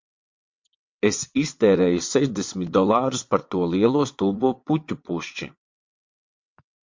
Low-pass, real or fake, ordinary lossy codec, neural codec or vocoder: 7.2 kHz; fake; MP3, 48 kbps; vocoder, 22.05 kHz, 80 mel bands, WaveNeXt